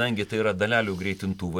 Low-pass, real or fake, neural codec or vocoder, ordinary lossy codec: 19.8 kHz; real; none; MP3, 96 kbps